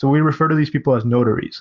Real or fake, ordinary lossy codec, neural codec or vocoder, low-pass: real; Opus, 32 kbps; none; 7.2 kHz